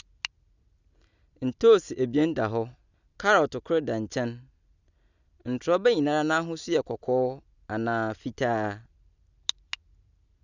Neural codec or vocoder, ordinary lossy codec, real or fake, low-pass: none; none; real; 7.2 kHz